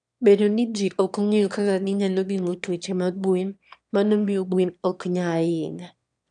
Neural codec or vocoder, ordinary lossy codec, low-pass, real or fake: autoencoder, 22.05 kHz, a latent of 192 numbers a frame, VITS, trained on one speaker; none; 9.9 kHz; fake